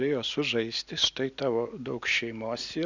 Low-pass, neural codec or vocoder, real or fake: 7.2 kHz; none; real